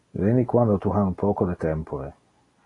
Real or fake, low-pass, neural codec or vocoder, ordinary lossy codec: real; 10.8 kHz; none; AAC, 32 kbps